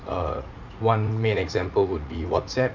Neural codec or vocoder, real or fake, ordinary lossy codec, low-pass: vocoder, 44.1 kHz, 80 mel bands, Vocos; fake; none; 7.2 kHz